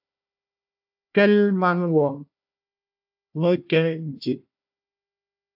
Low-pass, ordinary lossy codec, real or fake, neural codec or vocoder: 5.4 kHz; AAC, 48 kbps; fake; codec, 16 kHz, 1 kbps, FunCodec, trained on Chinese and English, 50 frames a second